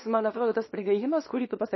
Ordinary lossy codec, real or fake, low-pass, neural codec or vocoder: MP3, 24 kbps; fake; 7.2 kHz; codec, 24 kHz, 0.9 kbps, WavTokenizer, small release